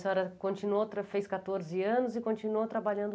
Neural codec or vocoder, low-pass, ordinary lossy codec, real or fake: none; none; none; real